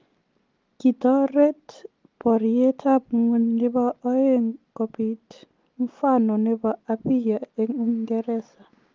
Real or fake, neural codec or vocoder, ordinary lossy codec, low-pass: real; none; Opus, 32 kbps; 7.2 kHz